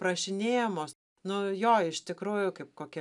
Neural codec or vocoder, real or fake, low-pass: none; real; 10.8 kHz